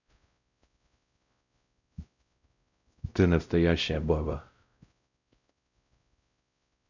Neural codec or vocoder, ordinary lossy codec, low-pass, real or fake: codec, 16 kHz, 0.5 kbps, X-Codec, WavLM features, trained on Multilingual LibriSpeech; none; 7.2 kHz; fake